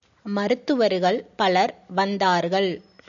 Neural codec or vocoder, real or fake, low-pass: none; real; 7.2 kHz